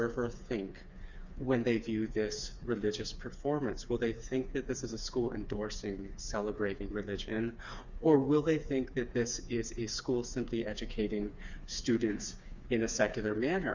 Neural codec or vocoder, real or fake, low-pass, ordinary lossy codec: codec, 16 kHz, 4 kbps, FreqCodec, smaller model; fake; 7.2 kHz; Opus, 64 kbps